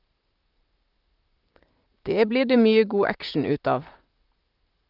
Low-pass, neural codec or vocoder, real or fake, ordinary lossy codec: 5.4 kHz; vocoder, 44.1 kHz, 128 mel bands, Pupu-Vocoder; fake; Opus, 24 kbps